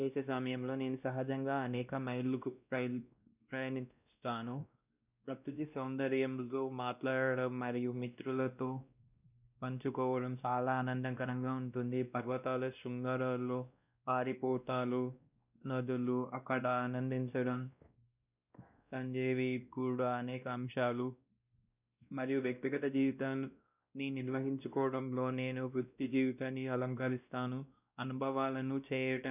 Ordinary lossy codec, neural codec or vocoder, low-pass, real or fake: none; codec, 16 kHz, 1 kbps, X-Codec, WavLM features, trained on Multilingual LibriSpeech; 3.6 kHz; fake